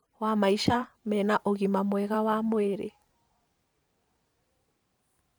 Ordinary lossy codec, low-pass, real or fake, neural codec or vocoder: none; none; fake; vocoder, 44.1 kHz, 128 mel bands every 512 samples, BigVGAN v2